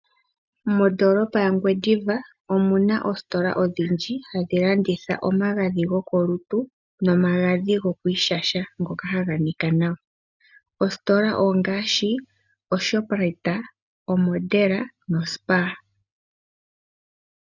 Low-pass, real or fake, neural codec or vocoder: 7.2 kHz; real; none